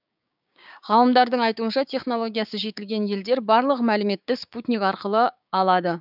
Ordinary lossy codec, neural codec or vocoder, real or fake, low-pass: none; codec, 16 kHz, 6 kbps, DAC; fake; 5.4 kHz